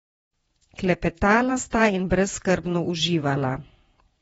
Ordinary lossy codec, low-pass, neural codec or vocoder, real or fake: AAC, 24 kbps; 19.8 kHz; vocoder, 48 kHz, 128 mel bands, Vocos; fake